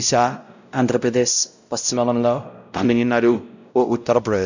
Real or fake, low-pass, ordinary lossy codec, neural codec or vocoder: fake; 7.2 kHz; none; codec, 16 kHz, 0.5 kbps, X-Codec, WavLM features, trained on Multilingual LibriSpeech